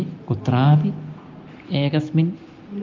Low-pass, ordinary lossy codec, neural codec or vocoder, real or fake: 7.2 kHz; Opus, 24 kbps; none; real